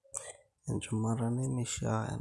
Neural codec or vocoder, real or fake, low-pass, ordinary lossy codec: none; real; none; none